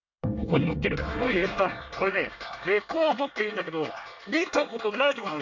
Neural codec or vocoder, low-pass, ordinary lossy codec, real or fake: codec, 24 kHz, 1 kbps, SNAC; 7.2 kHz; AAC, 48 kbps; fake